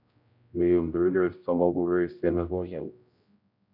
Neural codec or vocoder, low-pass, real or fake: codec, 16 kHz, 0.5 kbps, X-Codec, HuBERT features, trained on general audio; 5.4 kHz; fake